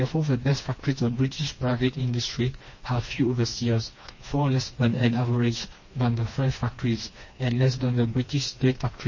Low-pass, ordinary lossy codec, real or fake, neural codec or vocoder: 7.2 kHz; MP3, 32 kbps; fake; codec, 16 kHz, 2 kbps, FreqCodec, smaller model